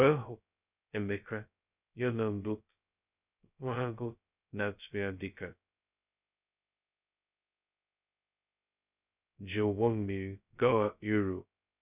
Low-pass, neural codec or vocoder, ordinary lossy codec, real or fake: 3.6 kHz; codec, 16 kHz, 0.2 kbps, FocalCodec; none; fake